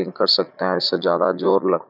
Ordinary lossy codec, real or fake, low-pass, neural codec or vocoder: none; fake; 5.4 kHz; vocoder, 44.1 kHz, 80 mel bands, Vocos